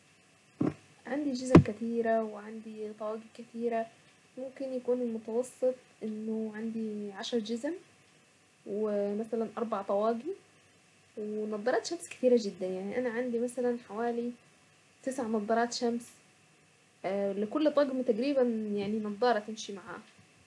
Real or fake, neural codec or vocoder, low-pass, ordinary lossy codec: real; none; none; none